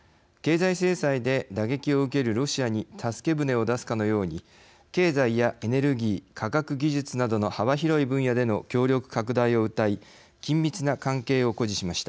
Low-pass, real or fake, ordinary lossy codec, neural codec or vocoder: none; real; none; none